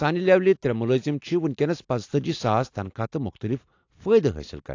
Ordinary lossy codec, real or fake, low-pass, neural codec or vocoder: AAC, 48 kbps; real; 7.2 kHz; none